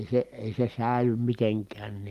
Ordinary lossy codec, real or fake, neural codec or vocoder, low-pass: Opus, 24 kbps; real; none; 14.4 kHz